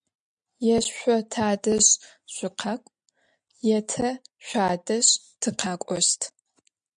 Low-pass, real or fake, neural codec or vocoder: 9.9 kHz; real; none